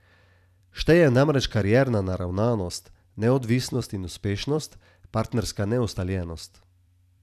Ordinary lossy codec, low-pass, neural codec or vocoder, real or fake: none; 14.4 kHz; vocoder, 44.1 kHz, 128 mel bands every 256 samples, BigVGAN v2; fake